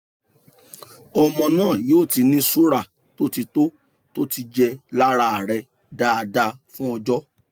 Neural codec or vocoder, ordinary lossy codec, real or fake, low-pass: none; none; real; none